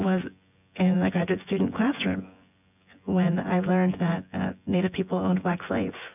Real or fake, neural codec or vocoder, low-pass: fake; vocoder, 24 kHz, 100 mel bands, Vocos; 3.6 kHz